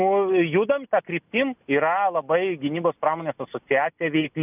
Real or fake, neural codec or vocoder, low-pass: real; none; 3.6 kHz